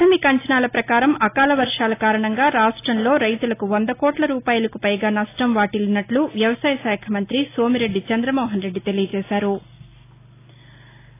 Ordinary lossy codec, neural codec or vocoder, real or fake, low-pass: AAC, 24 kbps; none; real; 3.6 kHz